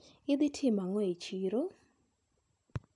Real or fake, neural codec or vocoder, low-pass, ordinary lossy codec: real; none; 10.8 kHz; none